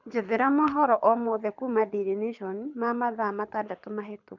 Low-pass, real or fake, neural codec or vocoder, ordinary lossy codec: 7.2 kHz; fake; codec, 24 kHz, 6 kbps, HILCodec; none